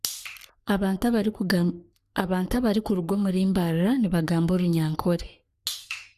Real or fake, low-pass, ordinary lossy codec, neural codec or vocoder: fake; 14.4 kHz; Opus, 64 kbps; codec, 44.1 kHz, 3.4 kbps, Pupu-Codec